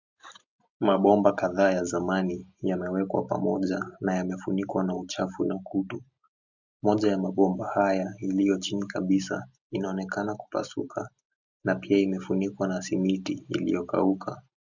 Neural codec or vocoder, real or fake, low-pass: none; real; 7.2 kHz